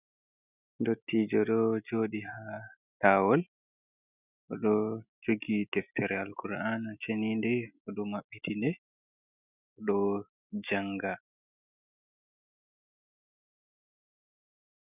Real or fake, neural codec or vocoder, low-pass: real; none; 3.6 kHz